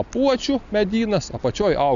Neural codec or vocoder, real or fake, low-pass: none; real; 7.2 kHz